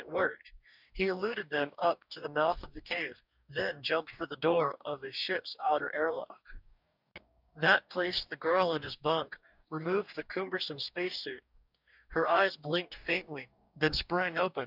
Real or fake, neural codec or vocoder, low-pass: fake; codec, 44.1 kHz, 2.6 kbps, DAC; 5.4 kHz